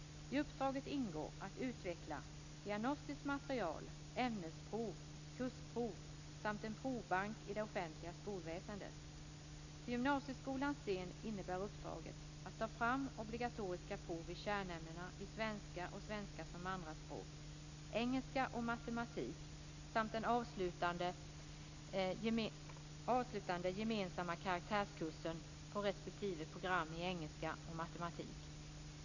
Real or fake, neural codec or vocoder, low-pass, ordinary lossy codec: real; none; 7.2 kHz; none